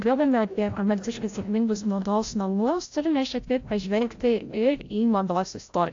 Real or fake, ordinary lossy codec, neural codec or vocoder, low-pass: fake; AAC, 48 kbps; codec, 16 kHz, 0.5 kbps, FreqCodec, larger model; 7.2 kHz